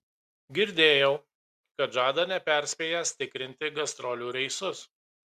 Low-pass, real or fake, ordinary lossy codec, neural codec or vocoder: 14.4 kHz; real; Opus, 64 kbps; none